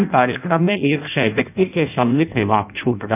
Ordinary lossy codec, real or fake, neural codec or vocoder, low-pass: none; fake; codec, 16 kHz in and 24 kHz out, 0.6 kbps, FireRedTTS-2 codec; 3.6 kHz